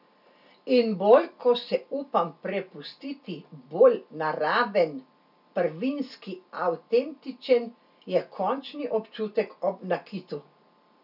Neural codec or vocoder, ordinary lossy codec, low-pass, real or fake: none; none; 5.4 kHz; real